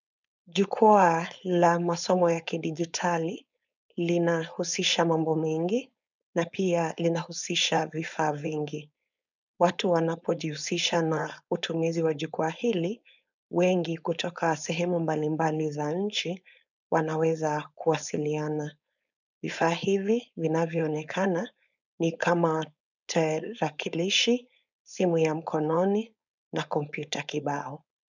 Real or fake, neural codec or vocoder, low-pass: fake; codec, 16 kHz, 4.8 kbps, FACodec; 7.2 kHz